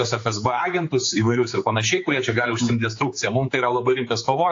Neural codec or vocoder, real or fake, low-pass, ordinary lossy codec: codec, 16 kHz, 4 kbps, X-Codec, HuBERT features, trained on general audio; fake; 7.2 kHz; AAC, 48 kbps